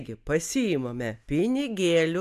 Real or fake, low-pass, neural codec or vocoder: real; 14.4 kHz; none